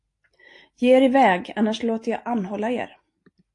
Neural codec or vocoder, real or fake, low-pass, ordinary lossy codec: none; real; 10.8 kHz; AAC, 48 kbps